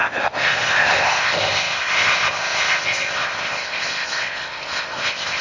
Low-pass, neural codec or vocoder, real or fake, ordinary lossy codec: 7.2 kHz; codec, 16 kHz in and 24 kHz out, 0.6 kbps, FocalCodec, streaming, 4096 codes; fake; AAC, 48 kbps